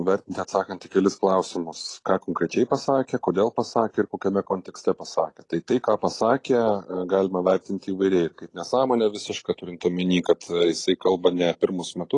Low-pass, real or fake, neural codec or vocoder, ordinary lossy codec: 10.8 kHz; real; none; AAC, 32 kbps